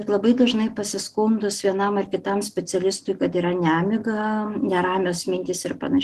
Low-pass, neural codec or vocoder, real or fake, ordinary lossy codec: 14.4 kHz; none; real; Opus, 16 kbps